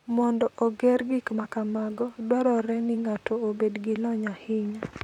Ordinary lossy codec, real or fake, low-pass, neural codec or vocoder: none; fake; 19.8 kHz; vocoder, 44.1 kHz, 128 mel bands, Pupu-Vocoder